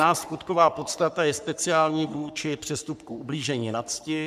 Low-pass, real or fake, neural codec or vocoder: 14.4 kHz; fake; codec, 44.1 kHz, 3.4 kbps, Pupu-Codec